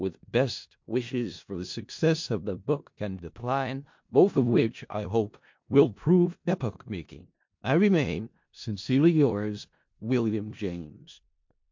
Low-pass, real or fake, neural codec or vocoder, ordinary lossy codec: 7.2 kHz; fake; codec, 16 kHz in and 24 kHz out, 0.4 kbps, LongCat-Audio-Codec, four codebook decoder; MP3, 48 kbps